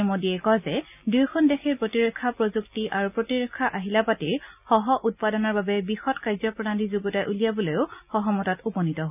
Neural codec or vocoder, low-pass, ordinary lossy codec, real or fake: none; 3.6 kHz; none; real